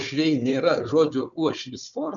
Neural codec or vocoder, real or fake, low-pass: codec, 16 kHz, 4 kbps, FunCodec, trained on Chinese and English, 50 frames a second; fake; 7.2 kHz